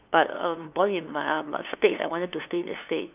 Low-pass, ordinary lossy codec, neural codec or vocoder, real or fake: 3.6 kHz; none; codec, 16 kHz, 2 kbps, FunCodec, trained on LibriTTS, 25 frames a second; fake